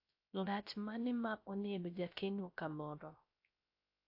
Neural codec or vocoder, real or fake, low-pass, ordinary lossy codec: codec, 16 kHz, 0.3 kbps, FocalCodec; fake; 5.4 kHz; AAC, 32 kbps